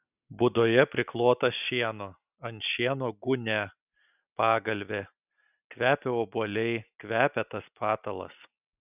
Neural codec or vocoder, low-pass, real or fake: none; 3.6 kHz; real